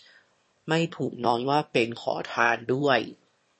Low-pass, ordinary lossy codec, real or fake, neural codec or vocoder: 9.9 kHz; MP3, 32 kbps; fake; autoencoder, 22.05 kHz, a latent of 192 numbers a frame, VITS, trained on one speaker